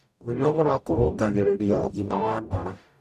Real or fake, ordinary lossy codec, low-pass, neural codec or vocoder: fake; none; 14.4 kHz; codec, 44.1 kHz, 0.9 kbps, DAC